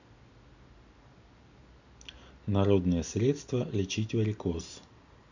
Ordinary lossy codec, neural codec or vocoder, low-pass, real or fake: none; none; 7.2 kHz; real